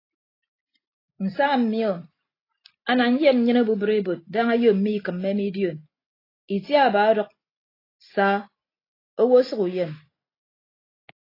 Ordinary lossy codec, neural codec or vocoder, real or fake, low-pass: AAC, 24 kbps; none; real; 5.4 kHz